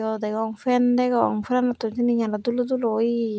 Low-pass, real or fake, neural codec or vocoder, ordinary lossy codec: none; real; none; none